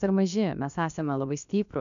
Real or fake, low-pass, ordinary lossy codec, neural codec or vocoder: fake; 7.2 kHz; AAC, 96 kbps; codec, 16 kHz, about 1 kbps, DyCAST, with the encoder's durations